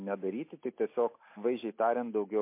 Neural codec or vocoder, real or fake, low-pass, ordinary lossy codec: none; real; 3.6 kHz; MP3, 24 kbps